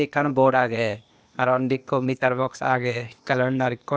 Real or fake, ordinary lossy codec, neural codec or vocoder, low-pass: fake; none; codec, 16 kHz, 0.8 kbps, ZipCodec; none